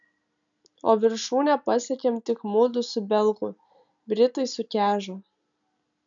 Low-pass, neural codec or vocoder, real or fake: 7.2 kHz; none; real